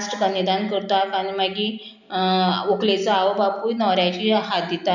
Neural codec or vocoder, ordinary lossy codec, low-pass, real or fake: none; none; 7.2 kHz; real